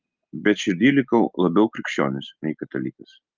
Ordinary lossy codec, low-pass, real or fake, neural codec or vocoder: Opus, 24 kbps; 7.2 kHz; real; none